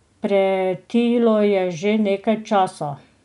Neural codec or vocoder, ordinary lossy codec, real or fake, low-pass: none; none; real; 10.8 kHz